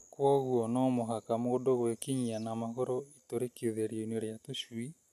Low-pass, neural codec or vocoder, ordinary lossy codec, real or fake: 14.4 kHz; none; none; real